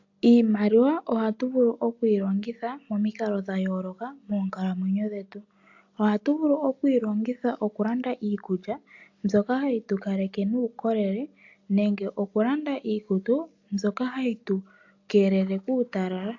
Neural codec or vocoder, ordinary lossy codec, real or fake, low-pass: none; AAC, 48 kbps; real; 7.2 kHz